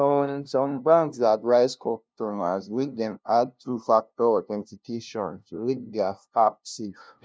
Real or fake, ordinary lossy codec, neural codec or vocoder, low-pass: fake; none; codec, 16 kHz, 0.5 kbps, FunCodec, trained on LibriTTS, 25 frames a second; none